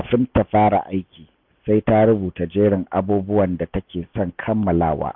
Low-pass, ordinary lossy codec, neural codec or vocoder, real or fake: 5.4 kHz; none; none; real